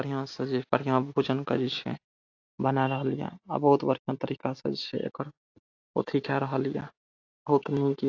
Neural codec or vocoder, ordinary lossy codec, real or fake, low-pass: none; AAC, 48 kbps; real; 7.2 kHz